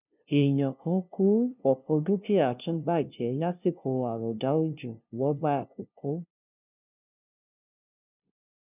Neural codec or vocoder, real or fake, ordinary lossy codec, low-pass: codec, 16 kHz, 0.5 kbps, FunCodec, trained on LibriTTS, 25 frames a second; fake; none; 3.6 kHz